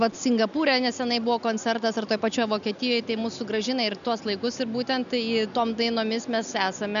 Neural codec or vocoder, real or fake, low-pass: none; real; 7.2 kHz